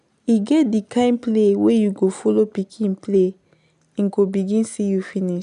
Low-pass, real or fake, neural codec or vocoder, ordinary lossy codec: 10.8 kHz; real; none; none